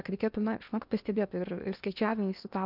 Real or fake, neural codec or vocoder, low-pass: fake; codec, 16 kHz in and 24 kHz out, 0.8 kbps, FocalCodec, streaming, 65536 codes; 5.4 kHz